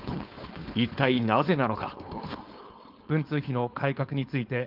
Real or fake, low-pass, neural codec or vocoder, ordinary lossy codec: fake; 5.4 kHz; codec, 16 kHz, 4.8 kbps, FACodec; Opus, 32 kbps